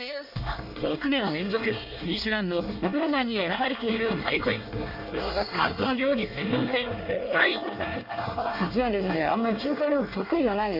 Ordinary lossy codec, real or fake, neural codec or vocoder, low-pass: none; fake; codec, 24 kHz, 1 kbps, SNAC; 5.4 kHz